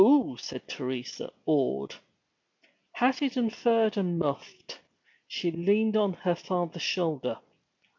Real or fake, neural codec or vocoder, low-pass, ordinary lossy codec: real; none; 7.2 kHz; MP3, 64 kbps